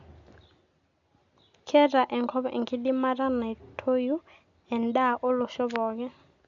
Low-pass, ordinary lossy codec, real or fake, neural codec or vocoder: 7.2 kHz; none; real; none